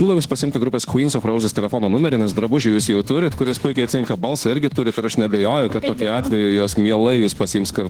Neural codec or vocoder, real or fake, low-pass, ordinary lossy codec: autoencoder, 48 kHz, 32 numbers a frame, DAC-VAE, trained on Japanese speech; fake; 19.8 kHz; Opus, 16 kbps